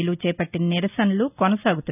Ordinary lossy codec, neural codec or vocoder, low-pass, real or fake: none; none; 3.6 kHz; real